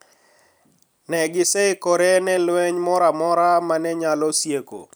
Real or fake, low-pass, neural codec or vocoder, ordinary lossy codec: fake; none; vocoder, 44.1 kHz, 128 mel bands every 256 samples, BigVGAN v2; none